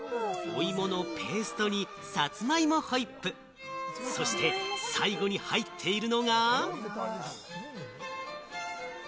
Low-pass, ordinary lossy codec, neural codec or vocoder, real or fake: none; none; none; real